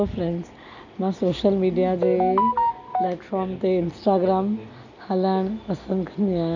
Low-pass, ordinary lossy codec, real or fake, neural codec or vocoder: 7.2 kHz; none; real; none